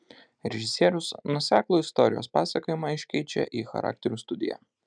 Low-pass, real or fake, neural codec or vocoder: 9.9 kHz; real; none